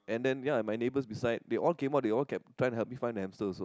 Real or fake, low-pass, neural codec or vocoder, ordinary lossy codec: real; none; none; none